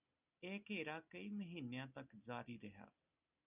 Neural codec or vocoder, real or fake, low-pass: none; real; 3.6 kHz